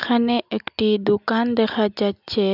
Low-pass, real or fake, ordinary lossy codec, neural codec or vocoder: 5.4 kHz; real; none; none